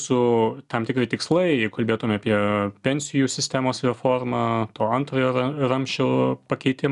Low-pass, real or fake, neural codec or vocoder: 10.8 kHz; real; none